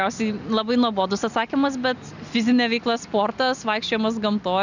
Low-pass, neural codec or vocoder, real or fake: 7.2 kHz; none; real